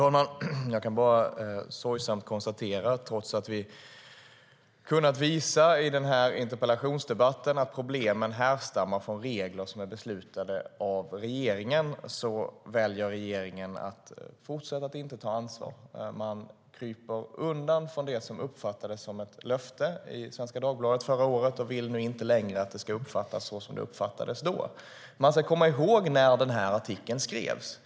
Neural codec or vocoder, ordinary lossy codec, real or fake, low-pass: none; none; real; none